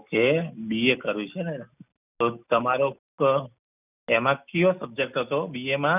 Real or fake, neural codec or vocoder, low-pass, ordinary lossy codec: real; none; 3.6 kHz; none